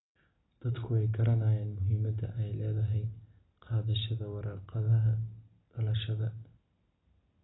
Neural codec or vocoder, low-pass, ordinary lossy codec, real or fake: none; 7.2 kHz; AAC, 16 kbps; real